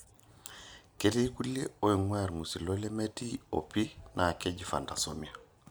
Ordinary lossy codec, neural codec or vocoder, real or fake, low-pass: none; none; real; none